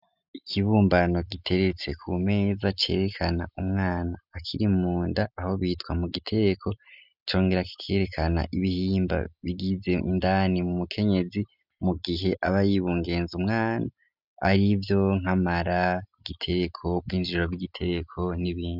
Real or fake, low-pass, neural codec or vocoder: real; 5.4 kHz; none